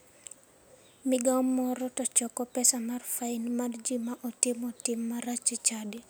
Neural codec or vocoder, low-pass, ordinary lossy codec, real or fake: none; none; none; real